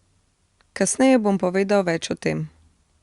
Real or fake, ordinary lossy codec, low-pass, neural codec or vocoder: real; Opus, 64 kbps; 10.8 kHz; none